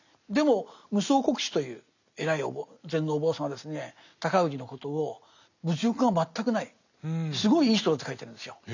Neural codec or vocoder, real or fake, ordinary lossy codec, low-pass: none; real; none; 7.2 kHz